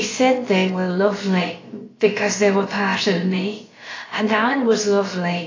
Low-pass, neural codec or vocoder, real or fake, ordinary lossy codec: 7.2 kHz; codec, 16 kHz, about 1 kbps, DyCAST, with the encoder's durations; fake; AAC, 32 kbps